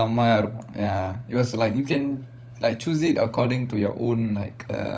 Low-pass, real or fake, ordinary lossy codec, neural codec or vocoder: none; fake; none; codec, 16 kHz, 16 kbps, FunCodec, trained on LibriTTS, 50 frames a second